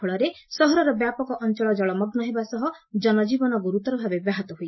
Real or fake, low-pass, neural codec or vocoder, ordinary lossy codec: real; 7.2 kHz; none; MP3, 24 kbps